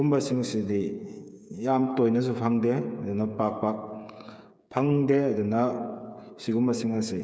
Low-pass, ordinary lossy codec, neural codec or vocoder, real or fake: none; none; codec, 16 kHz, 8 kbps, FreqCodec, smaller model; fake